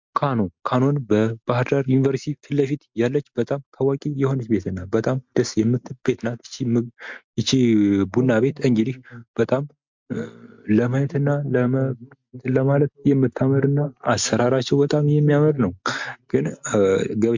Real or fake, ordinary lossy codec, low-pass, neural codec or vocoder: real; MP3, 64 kbps; 7.2 kHz; none